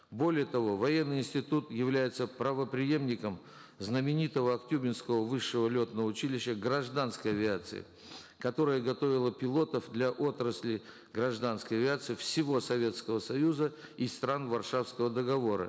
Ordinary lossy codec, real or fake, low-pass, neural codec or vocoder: none; real; none; none